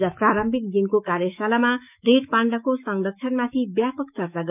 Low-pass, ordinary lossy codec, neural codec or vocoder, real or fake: 3.6 kHz; none; autoencoder, 48 kHz, 128 numbers a frame, DAC-VAE, trained on Japanese speech; fake